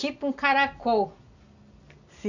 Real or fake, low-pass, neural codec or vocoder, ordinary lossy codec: real; 7.2 kHz; none; none